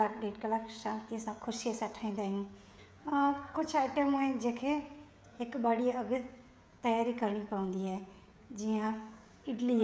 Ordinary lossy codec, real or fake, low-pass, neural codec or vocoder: none; fake; none; codec, 16 kHz, 16 kbps, FreqCodec, smaller model